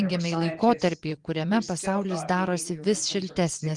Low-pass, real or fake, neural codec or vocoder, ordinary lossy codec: 10.8 kHz; fake; vocoder, 24 kHz, 100 mel bands, Vocos; Opus, 24 kbps